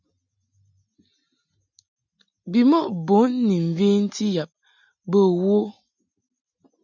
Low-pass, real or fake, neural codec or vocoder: 7.2 kHz; real; none